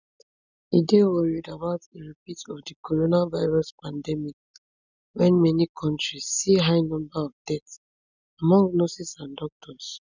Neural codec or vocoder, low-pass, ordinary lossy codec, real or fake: none; 7.2 kHz; none; real